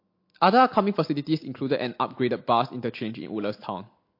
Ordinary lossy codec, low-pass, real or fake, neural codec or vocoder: MP3, 32 kbps; 5.4 kHz; real; none